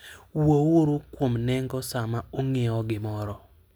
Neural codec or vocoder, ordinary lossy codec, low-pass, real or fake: none; none; none; real